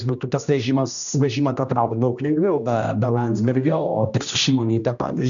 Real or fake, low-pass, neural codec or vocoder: fake; 7.2 kHz; codec, 16 kHz, 1 kbps, X-Codec, HuBERT features, trained on general audio